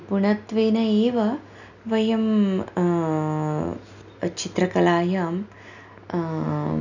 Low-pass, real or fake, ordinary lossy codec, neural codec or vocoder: 7.2 kHz; real; none; none